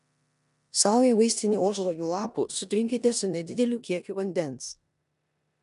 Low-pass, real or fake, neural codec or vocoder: 10.8 kHz; fake; codec, 16 kHz in and 24 kHz out, 0.9 kbps, LongCat-Audio-Codec, four codebook decoder